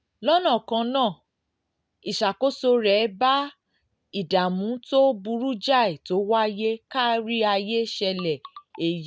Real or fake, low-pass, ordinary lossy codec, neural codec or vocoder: real; none; none; none